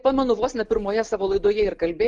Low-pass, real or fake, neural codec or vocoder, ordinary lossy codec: 10.8 kHz; real; none; Opus, 24 kbps